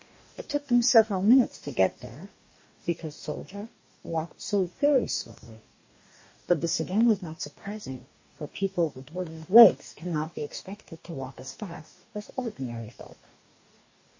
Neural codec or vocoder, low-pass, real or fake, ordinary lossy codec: codec, 44.1 kHz, 2.6 kbps, DAC; 7.2 kHz; fake; MP3, 32 kbps